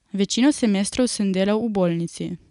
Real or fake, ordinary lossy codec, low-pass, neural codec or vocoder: real; none; 10.8 kHz; none